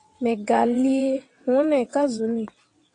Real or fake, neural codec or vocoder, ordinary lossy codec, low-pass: fake; vocoder, 22.05 kHz, 80 mel bands, WaveNeXt; AAC, 48 kbps; 9.9 kHz